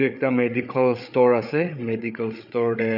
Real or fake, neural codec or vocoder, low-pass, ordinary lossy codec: fake; codec, 16 kHz, 8 kbps, FreqCodec, larger model; 5.4 kHz; none